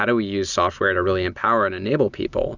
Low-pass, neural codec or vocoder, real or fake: 7.2 kHz; none; real